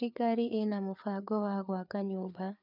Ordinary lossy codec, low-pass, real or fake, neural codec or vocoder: none; 5.4 kHz; fake; codec, 16 kHz, 4 kbps, FreqCodec, larger model